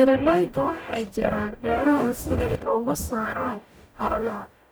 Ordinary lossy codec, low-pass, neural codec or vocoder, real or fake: none; none; codec, 44.1 kHz, 0.9 kbps, DAC; fake